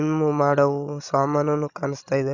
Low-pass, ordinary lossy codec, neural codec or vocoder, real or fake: 7.2 kHz; none; codec, 16 kHz, 16 kbps, FreqCodec, larger model; fake